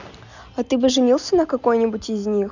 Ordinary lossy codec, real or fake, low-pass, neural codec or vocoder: none; real; 7.2 kHz; none